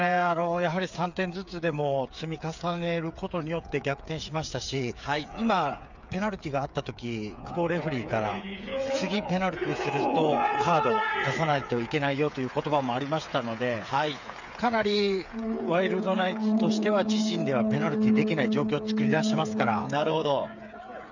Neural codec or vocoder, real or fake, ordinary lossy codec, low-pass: codec, 16 kHz, 8 kbps, FreqCodec, smaller model; fake; none; 7.2 kHz